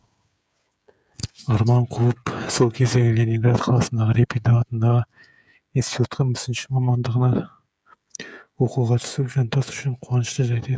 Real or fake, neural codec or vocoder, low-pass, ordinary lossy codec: fake; codec, 16 kHz, 16 kbps, FreqCodec, smaller model; none; none